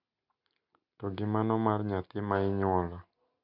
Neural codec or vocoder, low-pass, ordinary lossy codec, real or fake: none; 5.4 kHz; none; real